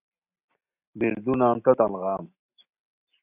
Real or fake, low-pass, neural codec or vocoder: real; 3.6 kHz; none